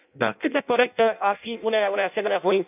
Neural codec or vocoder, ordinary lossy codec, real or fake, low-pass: codec, 16 kHz in and 24 kHz out, 0.6 kbps, FireRedTTS-2 codec; none; fake; 3.6 kHz